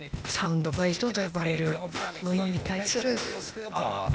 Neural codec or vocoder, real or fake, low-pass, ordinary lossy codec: codec, 16 kHz, 0.8 kbps, ZipCodec; fake; none; none